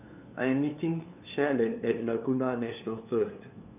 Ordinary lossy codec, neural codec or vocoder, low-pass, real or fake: none; codec, 16 kHz, 2 kbps, FunCodec, trained on LibriTTS, 25 frames a second; 3.6 kHz; fake